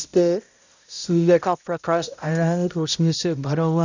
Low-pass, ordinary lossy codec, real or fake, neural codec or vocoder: 7.2 kHz; none; fake; codec, 16 kHz, 0.5 kbps, X-Codec, HuBERT features, trained on balanced general audio